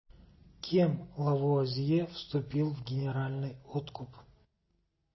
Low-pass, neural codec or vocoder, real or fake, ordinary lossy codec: 7.2 kHz; none; real; MP3, 24 kbps